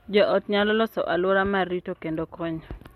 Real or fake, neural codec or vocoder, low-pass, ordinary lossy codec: real; none; 19.8 kHz; MP3, 64 kbps